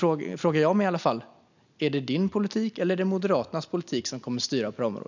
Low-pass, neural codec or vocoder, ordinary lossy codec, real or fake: 7.2 kHz; none; none; real